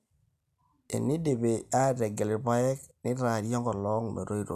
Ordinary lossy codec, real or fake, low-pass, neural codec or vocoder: none; real; 14.4 kHz; none